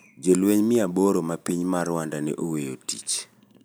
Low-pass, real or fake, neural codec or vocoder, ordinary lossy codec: none; real; none; none